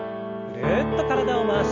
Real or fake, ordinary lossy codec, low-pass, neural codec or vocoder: real; none; 7.2 kHz; none